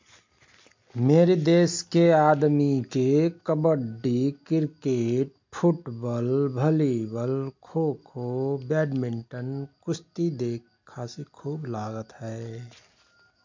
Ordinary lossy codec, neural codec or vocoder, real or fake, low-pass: MP3, 48 kbps; none; real; 7.2 kHz